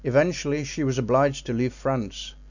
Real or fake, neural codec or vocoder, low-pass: real; none; 7.2 kHz